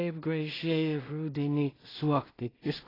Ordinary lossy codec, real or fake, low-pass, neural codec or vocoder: AAC, 24 kbps; fake; 5.4 kHz; codec, 16 kHz in and 24 kHz out, 0.4 kbps, LongCat-Audio-Codec, two codebook decoder